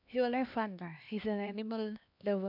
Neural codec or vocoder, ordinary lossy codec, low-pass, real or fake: codec, 16 kHz, 2 kbps, X-Codec, HuBERT features, trained on LibriSpeech; MP3, 48 kbps; 5.4 kHz; fake